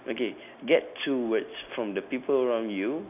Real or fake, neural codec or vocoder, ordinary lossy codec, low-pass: real; none; none; 3.6 kHz